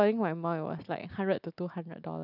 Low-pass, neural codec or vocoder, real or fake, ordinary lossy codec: 5.4 kHz; none; real; none